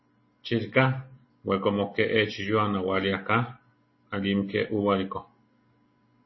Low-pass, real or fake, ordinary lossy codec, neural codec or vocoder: 7.2 kHz; real; MP3, 24 kbps; none